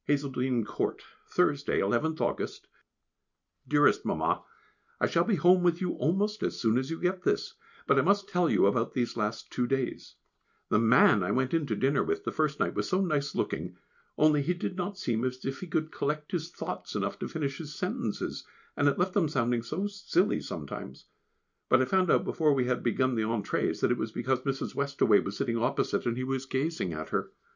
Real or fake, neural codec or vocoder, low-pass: real; none; 7.2 kHz